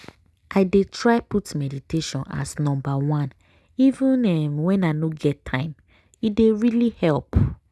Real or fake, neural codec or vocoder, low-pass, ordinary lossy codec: real; none; none; none